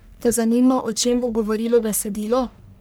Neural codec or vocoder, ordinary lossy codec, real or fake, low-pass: codec, 44.1 kHz, 1.7 kbps, Pupu-Codec; none; fake; none